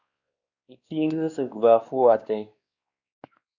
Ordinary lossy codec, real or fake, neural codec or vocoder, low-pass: Opus, 64 kbps; fake; codec, 16 kHz, 2 kbps, X-Codec, WavLM features, trained on Multilingual LibriSpeech; 7.2 kHz